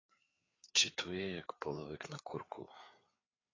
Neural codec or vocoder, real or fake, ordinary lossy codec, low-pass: codec, 16 kHz, 4 kbps, FreqCodec, larger model; fake; AAC, 32 kbps; 7.2 kHz